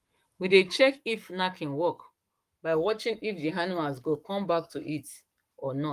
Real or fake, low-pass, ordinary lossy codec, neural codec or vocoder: fake; 14.4 kHz; Opus, 32 kbps; codec, 44.1 kHz, 7.8 kbps, Pupu-Codec